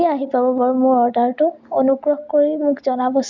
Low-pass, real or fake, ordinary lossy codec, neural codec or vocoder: 7.2 kHz; real; MP3, 64 kbps; none